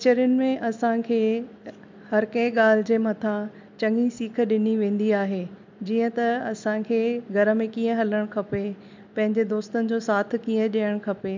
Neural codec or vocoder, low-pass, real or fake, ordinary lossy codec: none; 7.2 kHz; real; MP3, 64 kbps